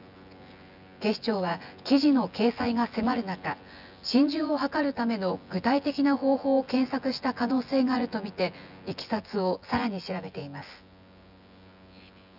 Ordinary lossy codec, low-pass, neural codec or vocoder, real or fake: none; 5.4 kHz; vocoder, 24 kHz, 100 mel bands, Vocos; fake